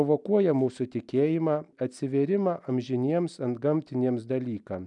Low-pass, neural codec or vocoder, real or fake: 10.8 kHz; none; real